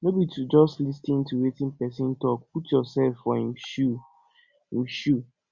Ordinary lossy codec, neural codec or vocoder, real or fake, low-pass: Opus, 64 kbps; none; real; 7.2 kHz